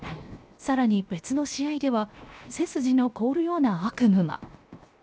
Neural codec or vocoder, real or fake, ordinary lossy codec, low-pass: codec, 16 kHz, 0.7 kbps, FocalCodec; fake; none; none